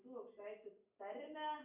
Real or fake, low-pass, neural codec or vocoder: real; 3.6 kHz; none